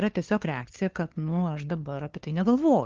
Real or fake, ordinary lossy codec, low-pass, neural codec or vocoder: fake; Opus, 16 kbps; 7.2 kHz; codec, 16 kHz, 2 kbps, FunCodec, trained on LibriTTS, 25 frames a second